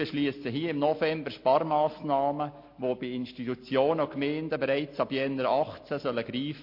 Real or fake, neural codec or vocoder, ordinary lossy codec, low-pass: real; none; MP3, 32 kbps; 5.4 kHz